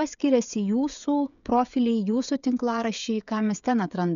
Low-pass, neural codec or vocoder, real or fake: 7.2 kHz; codec, 16 kHz, 16 kbps, FreqCodec, smaller model; fake